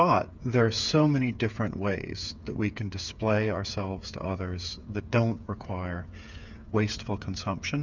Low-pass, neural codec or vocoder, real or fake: 7.2 kHz; codec, 16 kHz, 8 kbps, FreqCodec, smaller model; fake